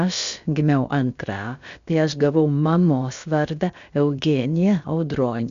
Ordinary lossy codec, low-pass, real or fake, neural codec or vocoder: Opus, 64 kbps; 7.2 kHz; fake; codec, 16 kHz, about 1 kbps, DyCAST, with the encoder's durations